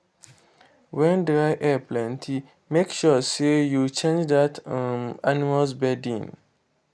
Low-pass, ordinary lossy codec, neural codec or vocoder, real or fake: none; none; none; real